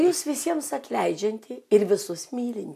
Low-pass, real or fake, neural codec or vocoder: 14.4 kHz; real; none